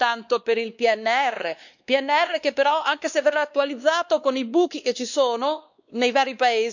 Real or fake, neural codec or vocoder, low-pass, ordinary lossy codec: fake; codec, 16 kHz, 2 kbps, X-Codec, WavLM features, trained on Multilingual LibriSpeech; 7.2 kHz; none